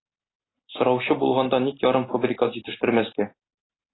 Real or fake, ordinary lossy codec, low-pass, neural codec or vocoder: real; AAC, 16 kbps; 7.2 kHz; none